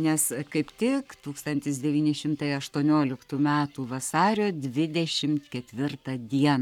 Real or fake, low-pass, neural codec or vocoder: fake; 19.8 kHz; codec, 44.1 kHz, 7.8 kbps, Pupu-Codec